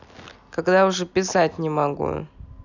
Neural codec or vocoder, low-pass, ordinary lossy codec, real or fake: none; 7.2 kHz; none; real